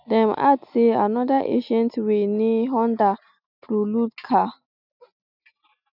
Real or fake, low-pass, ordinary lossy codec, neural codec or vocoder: real; 5.4 kHz; none; none